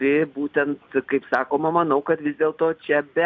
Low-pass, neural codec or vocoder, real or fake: 7.2 kHz; none; real